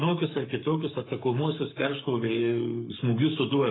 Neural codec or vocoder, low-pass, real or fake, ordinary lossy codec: codec, 44.1 kHz, 7.8 kbps, Pupu-Codec; 7.2 kHz; fake; AAC, 16 kbps